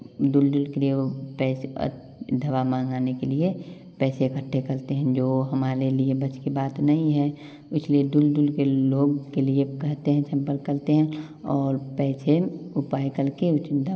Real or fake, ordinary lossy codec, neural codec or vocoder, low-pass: real; none; none; none